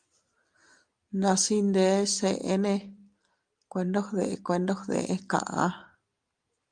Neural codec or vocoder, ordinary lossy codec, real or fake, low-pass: none; Opus, 32 kbps; real; 9.9 kHz